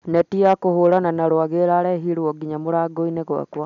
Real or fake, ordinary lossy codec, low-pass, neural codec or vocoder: real; none; 7.2 kHz; none